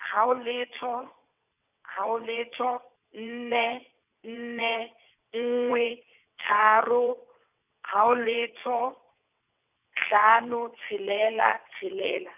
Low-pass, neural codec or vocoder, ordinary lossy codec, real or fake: 3.6 kHz; vocoder, 22.05 kHz, 80 mel bands, WaveNeXt; none; fake